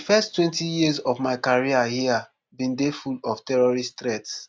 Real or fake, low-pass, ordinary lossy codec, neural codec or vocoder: real; none; none; none